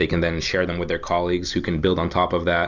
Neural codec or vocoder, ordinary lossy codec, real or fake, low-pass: none; MP3, 64 kbps; real; 7.2 kHz